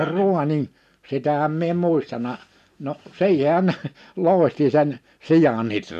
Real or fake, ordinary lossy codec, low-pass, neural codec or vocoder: real; none; 14.4 kHz; none